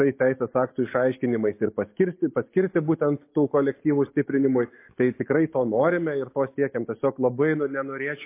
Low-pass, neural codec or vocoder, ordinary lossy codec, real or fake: 3.6 kHz; none; MP3, 24 kbps; real